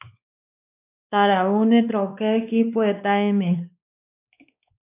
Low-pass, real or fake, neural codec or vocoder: 3.6 kHz; fake; codec, 16 kHz, 2 kbps, X-Codec, WavLM features, trained on Multilingual LibriSpeech